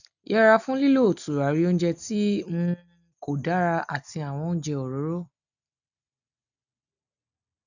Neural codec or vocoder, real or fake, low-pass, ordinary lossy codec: none; real; 7.2 kHz; none